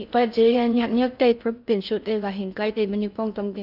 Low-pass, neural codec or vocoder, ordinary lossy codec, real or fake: 5.4 kHz; codec, 16 kHz in and 24 kHz out, 0.6 kbps, FocalCodec, streaming, 4096 codes; none; fake